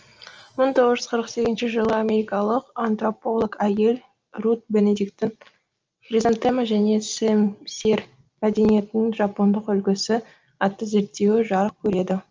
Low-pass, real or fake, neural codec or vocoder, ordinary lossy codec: 7.2 kHz; real; none; Opus, 24 kbps